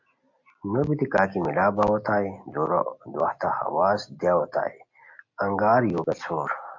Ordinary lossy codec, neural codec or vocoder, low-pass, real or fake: AAC, 48 kbps; none; 7.2 kHz; real